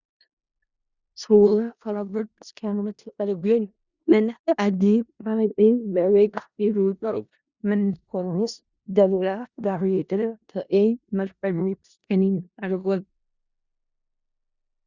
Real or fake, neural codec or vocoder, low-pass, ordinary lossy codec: fake; codec, 16 kHz in and 24 kHz out, 0.4 kbps, LongCat-Audio-Codec, four codebook decoder; 7.2 kHz; Opus, 64 kbps